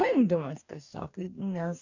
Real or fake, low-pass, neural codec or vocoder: fake; 7.2 kHz; codec, 44.1 kHz, 2.6 kbps, DAC